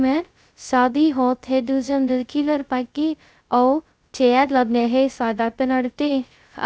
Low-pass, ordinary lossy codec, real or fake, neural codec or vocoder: none; none; fake; codec, 16 kHz, 0.2 kbps, FocalCodec